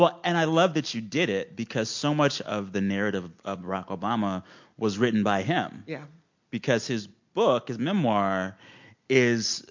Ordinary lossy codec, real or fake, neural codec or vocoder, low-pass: MP3, 48 kbps; real; none; 7.2 kHz